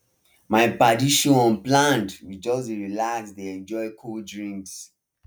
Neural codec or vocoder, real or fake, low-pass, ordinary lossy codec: none; real; none; none